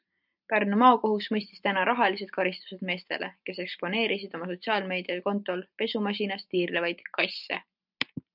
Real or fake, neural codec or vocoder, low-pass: real; none; 5.4 kHz